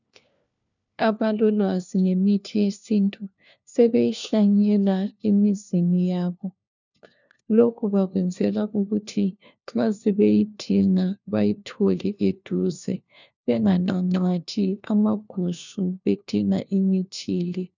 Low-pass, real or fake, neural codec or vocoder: 7.2 kHz; fake; codec, 16 kHz, 1 kbps, FunCodec, trained on LibriTTS, 50 frames a second